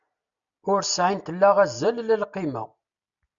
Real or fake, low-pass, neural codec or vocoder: real; 7.2 kHz; none